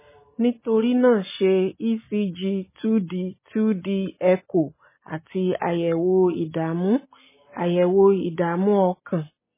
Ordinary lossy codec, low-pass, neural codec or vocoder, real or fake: MP3, 16 kbps; 3.6 kHz; none; real